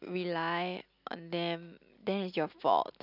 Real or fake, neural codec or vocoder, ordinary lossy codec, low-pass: real; none; none; 5.4 kHz